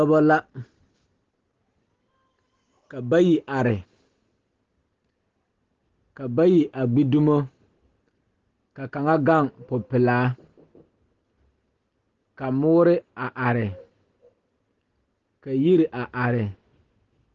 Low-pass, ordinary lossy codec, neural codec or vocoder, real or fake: 7.2 kHz; Opus, 16 kbps; none; real